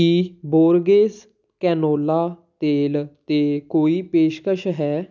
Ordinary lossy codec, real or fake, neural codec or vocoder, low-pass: none; real; none; 7.2 kHz